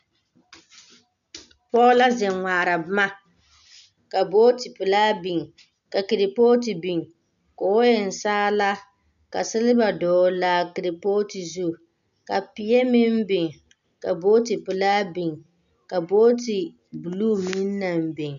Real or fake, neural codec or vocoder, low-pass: real; none; 7.2 kHz